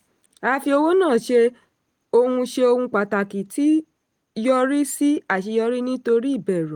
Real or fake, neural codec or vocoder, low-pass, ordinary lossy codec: real; none; 19.8 kHz; Opus, 24 kbps